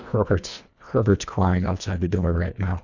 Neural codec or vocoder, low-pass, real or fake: codec, 24 kHz, 1.5 kbps, HILCodec; 7.2 kHz; fake